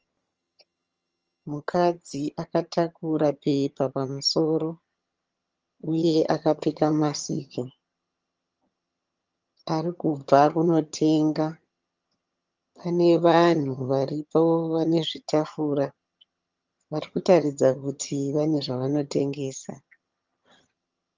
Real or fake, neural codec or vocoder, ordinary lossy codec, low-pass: fake; vocoder, 22.05 kHz, 80 mel bands, HiFi-GAN; Opus, 24 kbps; 7.2 kHz